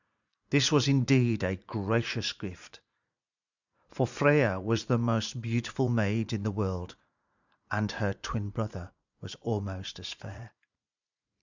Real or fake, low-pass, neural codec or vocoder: fake; 7.2 kHz; vocoder, 44.1 kHz, 80 mel bands, Vocos